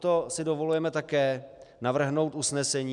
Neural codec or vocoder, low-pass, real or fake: none; 10.8 kHz; real